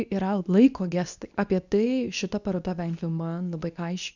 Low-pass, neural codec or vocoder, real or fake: 7.2 kHz; codec, 24 kHz, 0.9 kbps, WavTokenizer, medium speech release version 2; fake